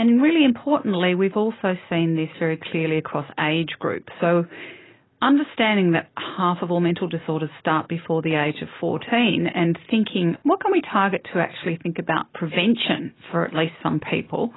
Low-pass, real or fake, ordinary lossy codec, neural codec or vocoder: 7.2 kHz; real; AAC, 16 kbps; none